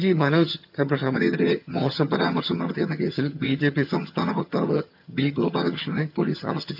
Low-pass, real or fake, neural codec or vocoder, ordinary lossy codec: 5.4 kHz; fake; vocoder, 22.05 kHz, 80 mel bands, HiFi-GAN; none